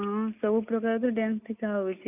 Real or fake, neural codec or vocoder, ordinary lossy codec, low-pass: real; none; none; 3.6 kHz